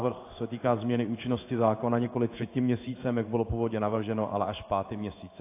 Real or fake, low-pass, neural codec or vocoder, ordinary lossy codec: fake; 3.6 kHz; codec, 16 kHz in and 24 kHz out, 1 kbps, XY-Tokenizer; AAC, 24 kbps